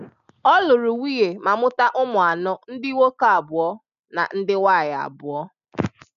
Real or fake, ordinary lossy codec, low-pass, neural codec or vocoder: real; none; 7.2 kHz; none